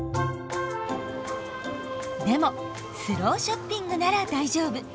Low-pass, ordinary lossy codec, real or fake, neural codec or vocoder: none; none; real; none